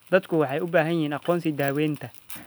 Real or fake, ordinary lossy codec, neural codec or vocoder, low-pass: real; none; none; none